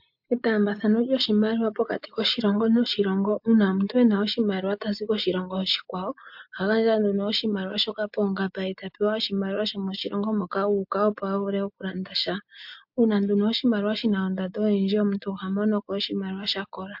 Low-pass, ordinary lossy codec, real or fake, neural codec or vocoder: 5.4 kHz; MP3, 48 kbps; real; none